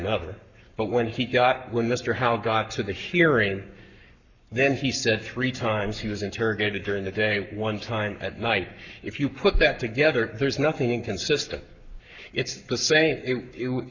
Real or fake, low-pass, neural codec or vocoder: fake; 7.2 kHz; codec, 44.1 kHz, 7.8 kbps, Pupu-Codec